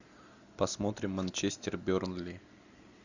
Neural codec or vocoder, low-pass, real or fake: none; 7.2 kHz; real